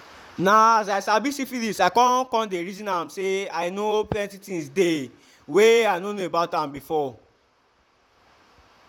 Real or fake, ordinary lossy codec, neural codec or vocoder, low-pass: fake; none; vocoder, 44.1 kHz, 128 mel bands, Pupu-Vocoder; 19.8 kHz